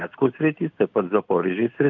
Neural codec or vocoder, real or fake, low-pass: none; real; 7.2 kHz